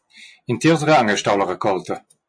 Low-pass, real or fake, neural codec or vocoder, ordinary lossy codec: 9.9 kHz; real; none; MP3, 48 kbps